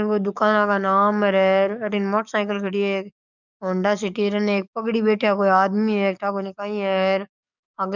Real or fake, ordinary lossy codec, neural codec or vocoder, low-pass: fake; none; codec, 44.1 kHz, 7.8 kbps, DAC; 7.2 kHz